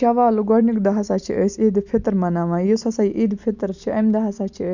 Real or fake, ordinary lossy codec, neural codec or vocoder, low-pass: real; none; none; 7.2 kHz